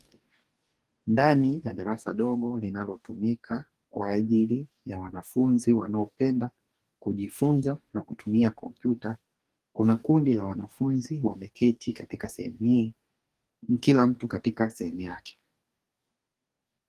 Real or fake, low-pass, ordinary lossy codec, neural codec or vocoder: fake; 14.4 kHz; Opus, 24 kbps; codec, 44.1 kHz, 2.6 kbps, DAC